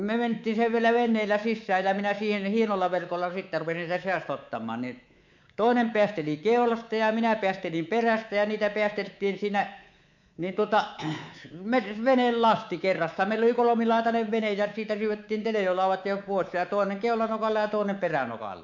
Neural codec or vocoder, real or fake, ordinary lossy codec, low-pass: codec, 24 kHz, 3.1 kbps, DualCodec; fake; none; 7.2 kHz